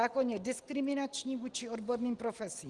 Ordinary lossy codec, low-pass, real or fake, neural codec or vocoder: Opus, 24 kbps; 10.8 kHz; real; none